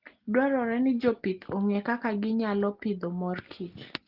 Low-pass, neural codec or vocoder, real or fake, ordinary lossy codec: 5.4 kHz; none; real; Opus, 16 kbps